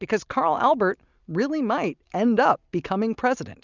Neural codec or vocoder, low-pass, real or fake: none; 7.2 kHz; real